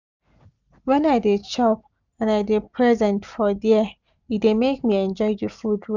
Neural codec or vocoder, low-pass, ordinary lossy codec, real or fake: none; 7.2 kHz; none; real